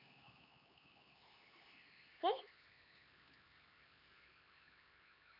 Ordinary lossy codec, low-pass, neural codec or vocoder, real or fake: AAC, 48 kbps; 5.4 kHz; codec, 16 kHz, 4 kbps, X-Codec, HuBERT features, trained on LibriSpeech; fake